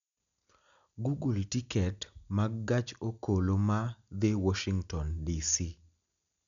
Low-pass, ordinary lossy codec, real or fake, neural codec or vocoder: 7.2 kHz; none; real; none